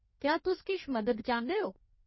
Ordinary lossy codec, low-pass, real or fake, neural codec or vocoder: MP3, 24 kbps; 7.2 kHz; fake; codec, 16 kHz in and 24 kHz out, 1.1 kbps, FireRedTTS-2 codec